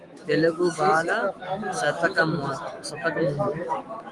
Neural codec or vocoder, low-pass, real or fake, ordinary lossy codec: autoencoder, 48 kHz, 128 numbers a frame, DAC-VAE, trained on Japanese speech; 10.8 kHz; fake; Opus, 24 kbps